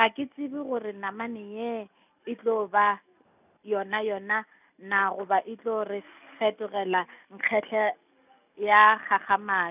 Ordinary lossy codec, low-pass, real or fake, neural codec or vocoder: none; 3.6 kHz; real; none